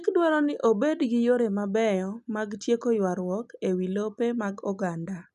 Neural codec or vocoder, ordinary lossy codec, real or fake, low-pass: none; none; real; 10.8 kHz